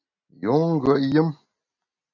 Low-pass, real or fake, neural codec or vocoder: 7.2 kHz; real; none